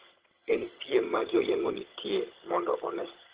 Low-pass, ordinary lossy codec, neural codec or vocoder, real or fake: 3.6 kHz; Opus, 16 kbps; vocoder, 22.05 kHz, 80 mel bands, HiFi-GAN; fake